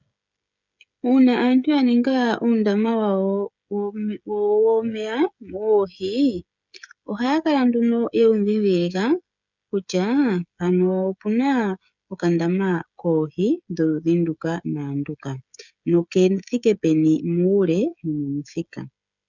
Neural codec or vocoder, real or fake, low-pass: codec, 16 kHz, 16 kbps, FreqCodec, smaller model; fake; 7.2 kHz